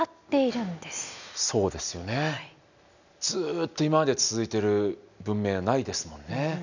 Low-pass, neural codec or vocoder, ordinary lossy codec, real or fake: 7.2 kHz; none; none; real